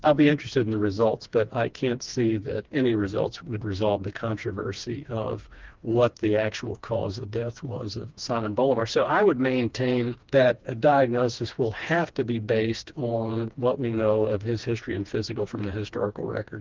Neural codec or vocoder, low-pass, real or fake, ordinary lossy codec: codec, 16 kHz, 2 kbps, FreqCodec, smaller model; 7.2 kHz; fake; Opus, 32 kbps